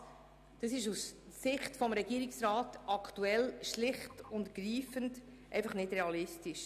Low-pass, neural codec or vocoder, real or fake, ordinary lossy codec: 14.4 kHz; none; real; none